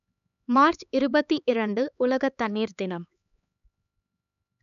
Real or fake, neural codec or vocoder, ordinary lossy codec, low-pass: fake; codec, 16 kHz, 4 kbps, X-Codec, HuBERT features, trained on LibriSpeech; none; 7.2 kHz